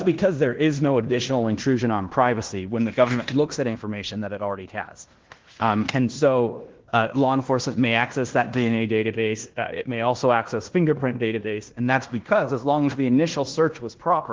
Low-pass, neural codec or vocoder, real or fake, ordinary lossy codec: 7.2 kHz; codec, 16 kHz in and 24 kHz out, 0.9 kbps, LongCat-Audio-Codec, fine tuned four codebook decoder; fake; Opus, 16 kbps